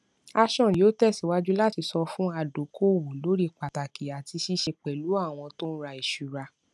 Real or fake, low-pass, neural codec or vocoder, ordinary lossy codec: real; none; none; none